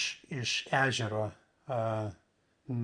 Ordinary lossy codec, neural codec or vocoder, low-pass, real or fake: Opus, 64 kbps; none; 9.9 kHz; real